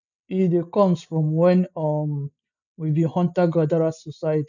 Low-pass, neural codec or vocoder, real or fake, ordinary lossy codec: 7.2 kHz; none; real; none